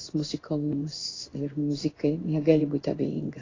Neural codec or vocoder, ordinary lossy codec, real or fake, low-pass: codec, 16 kHz in and 24 kHz out, 1 kbps, XY-Tokenizer; AAC, 32 kbps; fake; 7.2 kHz